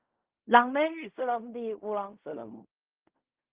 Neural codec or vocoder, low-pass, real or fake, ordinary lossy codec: codec, 16 kHz in and 24 kHz out, 0.4 kbps, LongCat-Audio-Codec, fine tuned four codebook decoder; 3.6 kHz; fake; Opus, 32 kbps